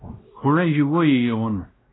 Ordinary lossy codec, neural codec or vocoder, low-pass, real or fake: AAC, 16 kbps; codec, 16 kHz, 1 kbps, X-Codec, WavLM features, trained on Multilingual LibriSpeech; 7.2 kHz; fake